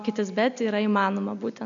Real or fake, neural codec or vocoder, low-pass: real; none; 7.2 kHz